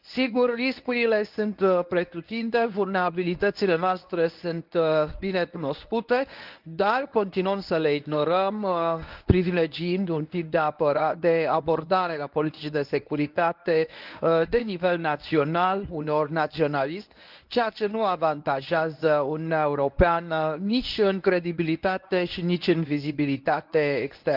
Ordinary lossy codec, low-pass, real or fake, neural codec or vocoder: Opus, 24 kbps; 5.4 kHz; fake; codec, 24 kHz, 0.9 kbps, WavTokenizer, medium speech release version 1